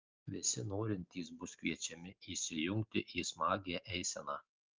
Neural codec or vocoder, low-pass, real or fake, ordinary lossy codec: none; 7.2 kHz; real; Opus, 32 kbps